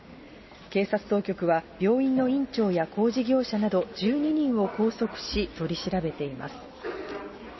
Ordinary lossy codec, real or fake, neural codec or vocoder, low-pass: MP3, 24 kbps; real; none; 7.2 kHz